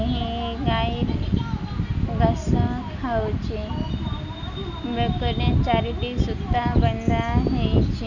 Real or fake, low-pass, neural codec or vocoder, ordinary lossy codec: real; 7.2 kHz; none; none